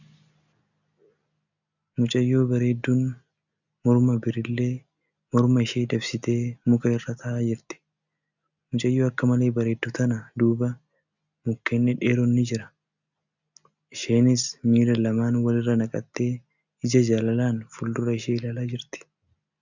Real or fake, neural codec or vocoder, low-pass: real; none; 7.2 kHz